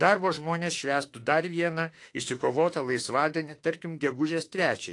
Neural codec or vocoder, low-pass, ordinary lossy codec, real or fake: autoencoder, 48 kHz, 32 numbers a frame, DAC-VAE, trained on Japanese speech; 10.8 kHz; AAC, 48 kbps; fake